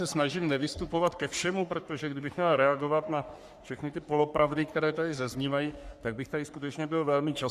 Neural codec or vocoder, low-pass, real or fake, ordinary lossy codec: codec, 44.1 kHz, 3.4 kbps, Pupu-Codec; 14.4 kHz; fake; Opus, 64 kbps